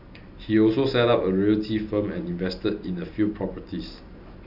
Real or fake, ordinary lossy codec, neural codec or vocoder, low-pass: real; none; none; 5.4 kHz